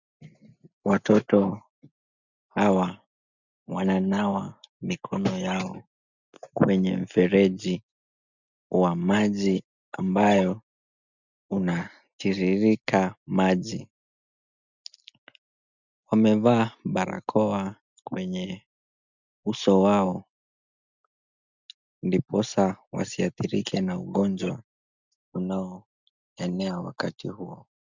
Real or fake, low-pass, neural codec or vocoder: real; 7.2 kHz; none